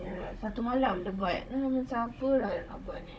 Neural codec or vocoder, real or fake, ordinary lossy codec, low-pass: codec, 16 kHz, 16 kbps, FunCodec, trained on Chinese and English, 50 frames a second; fake; none; none